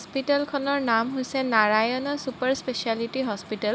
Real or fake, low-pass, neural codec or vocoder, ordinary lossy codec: real; none; none; none